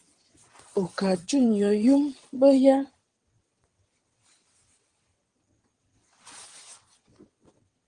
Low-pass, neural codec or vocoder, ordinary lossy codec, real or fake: 9.9 kHz; vocoder, 22.05 kHz, 80 mel bands, WaveNeXt; Opus, 24 kbps; fake